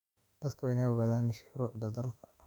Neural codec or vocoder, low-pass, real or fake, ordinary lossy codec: autoencoder, 48 kHz, 32 numbers a frame, DAC-VAE, trained on Japanese speech; 19.8 kHz; fake; none